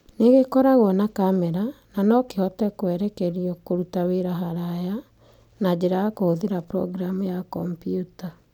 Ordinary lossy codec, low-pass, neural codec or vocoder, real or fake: none; 19.8 kHz; none; real